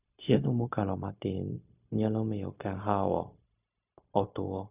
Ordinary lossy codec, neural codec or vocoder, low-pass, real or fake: none; codec, 16 kHz, 0.4 kbps, LongCat-Audio-Codec; 3.6 kHz; fake